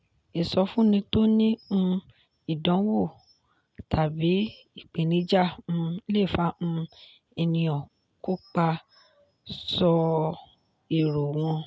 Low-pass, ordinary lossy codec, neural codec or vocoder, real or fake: none; none; none; real